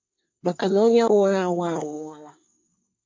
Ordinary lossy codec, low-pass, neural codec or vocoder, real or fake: MP3, 48 kbps; 7.2 kHz; codec, 24 kHz, 1 kbps, SNAC; fake